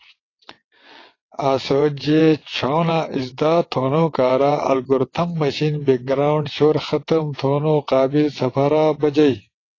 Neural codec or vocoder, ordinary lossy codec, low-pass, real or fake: vocoder, 22.05 kHz, 80 mel bands, WaveNeXt; AAC, 32 kbps; 7.2 kHz; fake